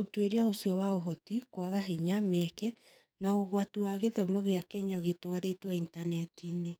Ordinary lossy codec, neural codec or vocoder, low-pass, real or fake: none; codec, 44.1 kHz, 2.6 kbps, SNAC; none; fake